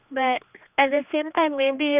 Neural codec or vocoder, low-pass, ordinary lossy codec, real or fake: codec, 16 kHz, 2 kbps, X-Codec, HuBERT features, trained on general audio; 3.6 kHz; none; fake